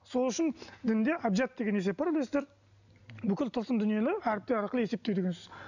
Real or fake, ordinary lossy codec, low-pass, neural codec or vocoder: real; none; 7.2 kHz; none